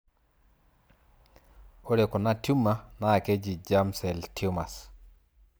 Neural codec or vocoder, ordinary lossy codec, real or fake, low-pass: none; none; real; none